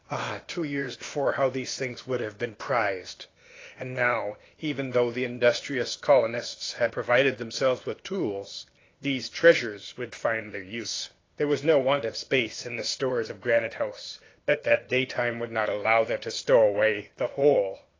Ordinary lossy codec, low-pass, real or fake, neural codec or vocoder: AAC, 32 kbps; 7.2 kHz; fake; codec, 16 kHz, 0.8 kbps, ZipCodec